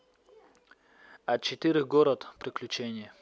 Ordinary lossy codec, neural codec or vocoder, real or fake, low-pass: none; none; real; none